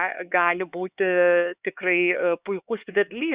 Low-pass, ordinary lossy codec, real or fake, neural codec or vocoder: 3.6 kHz; Opus, 64 kbps; fake; codec, 16 kHz, 4 kbps, X-Codec, HuBERT features, trained on LibriSpeech